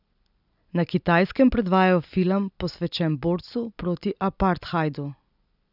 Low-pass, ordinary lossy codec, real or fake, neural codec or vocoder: 5.4 kHz; none; real; none